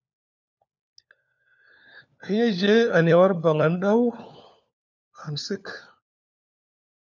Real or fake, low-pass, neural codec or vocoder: fake; 7.2 kHz; codec, 16 kHz, 4 kbps, FunCodec, trained on LibriTTS, 50 frames a second